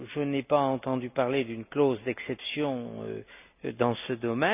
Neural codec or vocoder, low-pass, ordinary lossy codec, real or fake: none; 3.6 kHz; none; real